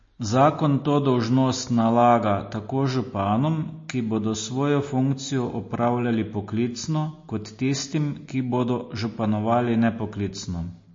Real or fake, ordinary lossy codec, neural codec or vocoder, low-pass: real; MP3, 32 kbps; none; 7.2 kHz